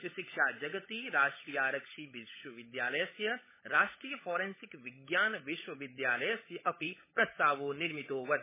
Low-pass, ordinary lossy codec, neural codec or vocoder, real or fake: 3.6 kHz; MP3, 16 kbps; codec, 16 kHz, 16 kbps, FunCodec, trained on LibriTTS, 50 frames a second; fake